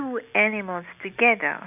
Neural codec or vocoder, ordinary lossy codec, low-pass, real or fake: none; none; 3.6 kHz; real